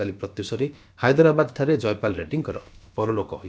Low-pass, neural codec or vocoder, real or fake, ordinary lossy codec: none; codec, 16 kHz, about 1 kbps, DyCAST, with the encoder's durations; fake; none